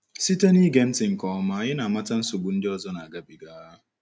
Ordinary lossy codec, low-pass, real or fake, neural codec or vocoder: none; none; real; none